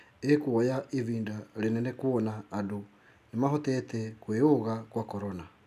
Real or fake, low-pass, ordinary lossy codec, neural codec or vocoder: real; 14.4 kHz; none; none